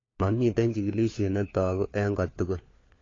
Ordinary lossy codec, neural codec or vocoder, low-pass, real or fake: AAC, 32 kbps; codec, 16 kHz, 4 kbps, FunCodec, trained on LibriTTS, 50 frames a second; 7.2 kHz; fake